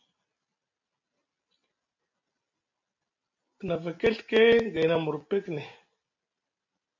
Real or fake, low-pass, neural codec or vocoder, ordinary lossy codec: real; 7.2 kHz; none; MP3, 48 kbps